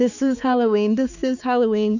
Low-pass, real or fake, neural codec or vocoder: 7.2 kHz; fake; codec, 16 kHz, 4 kbps, X-Codec, HuBERT features, trained on balanced general audio